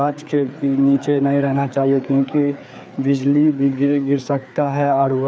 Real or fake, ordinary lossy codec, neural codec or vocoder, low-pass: fake; none; codec, 16 kHz, 4 kbps, FreqCodec, larger model; none